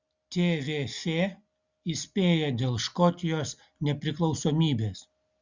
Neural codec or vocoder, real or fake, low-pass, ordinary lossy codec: none; real; 7.2 kHz; Opus, 64 kbps